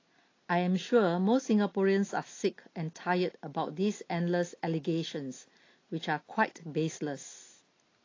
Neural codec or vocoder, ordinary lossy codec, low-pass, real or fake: none; AAC, 32 kbps; 7.2 kHz; real